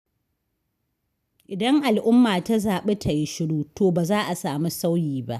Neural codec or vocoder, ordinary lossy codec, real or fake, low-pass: none; none; real; 14.4 kHz